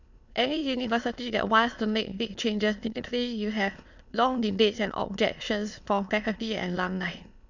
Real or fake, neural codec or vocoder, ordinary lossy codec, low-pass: fake; autoencoder, 22.05 kHz, a latent of 192 numbers a frame, VITS, trained on many speakers; none; 7.2 kHz